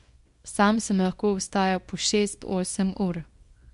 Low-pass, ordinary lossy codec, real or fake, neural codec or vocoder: 10.8 kHz; MP3, 64 kbps; fake; codec, 24 kHz, 0.9 kbps, WavTokenizer, small release